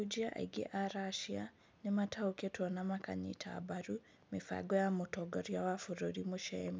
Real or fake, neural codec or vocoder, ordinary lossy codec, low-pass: real; none; none; none